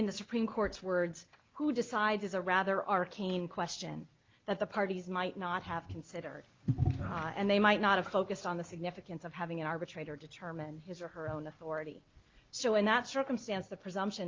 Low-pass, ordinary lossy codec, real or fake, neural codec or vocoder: 7.2 kHz; Opus, 32 kbps; real; none